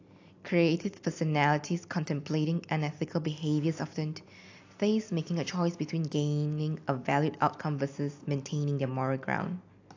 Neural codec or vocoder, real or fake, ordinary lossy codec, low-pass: none; real; AAC, 48 kbps; 7.2 kHz